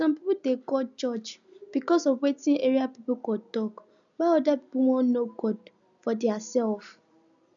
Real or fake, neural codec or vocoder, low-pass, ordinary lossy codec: real; none; 7.2 kHz; none